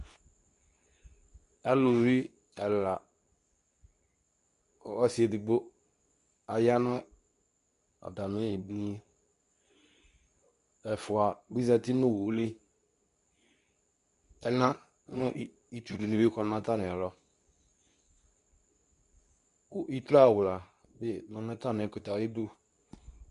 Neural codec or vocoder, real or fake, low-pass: codec, 24 kHz, 0.9 kbps, WavTokenizer, medium speech release version 2; fake; 10.8 kHz